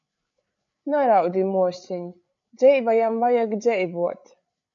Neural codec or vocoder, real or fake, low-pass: codec, 16 kHz, 8 kbps, FreqCodec, larger model; fake; 7.2 kHz